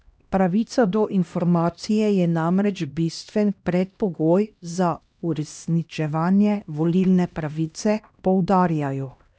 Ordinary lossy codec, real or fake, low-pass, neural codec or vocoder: none; fake; none; codec, 16 kHz, 1 kbps, X-Codec, HuBERT features, trained on LibriSpeech